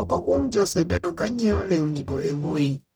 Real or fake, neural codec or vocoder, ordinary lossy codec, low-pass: fake; codec, 44.1 kHz, 0.9 kbps, DAC; none; none